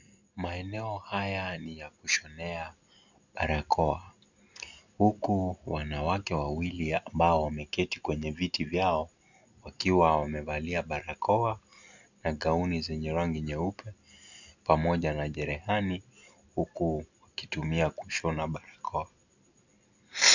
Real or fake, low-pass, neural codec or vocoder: real; 7.2 kHz; none